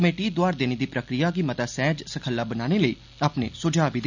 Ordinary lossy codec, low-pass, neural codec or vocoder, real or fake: none; 7.2 kHz; none; real